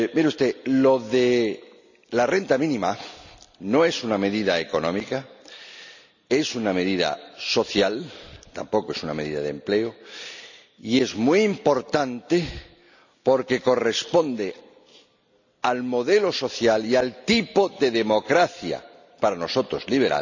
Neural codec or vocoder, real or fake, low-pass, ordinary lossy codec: none; real; 7.2 kHz; none